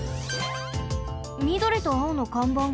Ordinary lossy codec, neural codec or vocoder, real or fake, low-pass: none; none; real; none